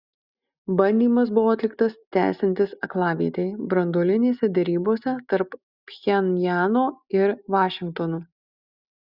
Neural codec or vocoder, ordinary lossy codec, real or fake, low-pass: none; Opus, 64 kbps; real; 5.4 kHz